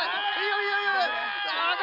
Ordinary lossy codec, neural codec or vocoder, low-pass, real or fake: none; none; 5.4 kHz; real